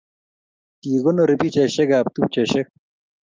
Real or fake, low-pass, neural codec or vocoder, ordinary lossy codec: real; 7.2 kHz; none; Opus, 24 kbps